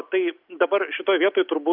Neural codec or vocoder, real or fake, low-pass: none; real; 5.4 kHz